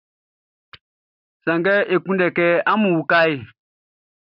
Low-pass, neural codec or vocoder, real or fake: 5.4 kHz; none; real